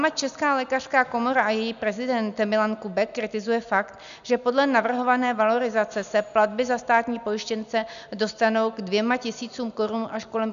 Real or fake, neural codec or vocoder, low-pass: real; none; 7.2 kHz